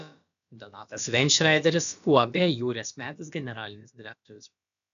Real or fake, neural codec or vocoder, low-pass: fake; codec, 16 kHz, about 1 kbps, DyCAST, with the encoder's durations; 7.2 kHz